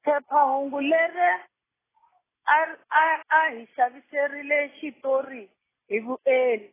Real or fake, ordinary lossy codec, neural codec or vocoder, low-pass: real; AAC, 16 kbps; none; 3.6 kHz